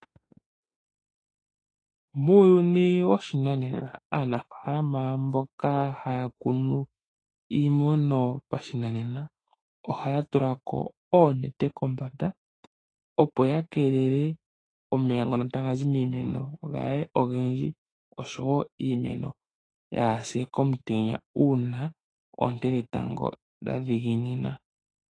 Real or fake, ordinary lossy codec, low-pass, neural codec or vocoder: fake; AAC, 32 kbps; 9.9 kHz; autoencoder, 48 kHz, 32 numbers a frame, DAC-VAE, trained on Japanese speech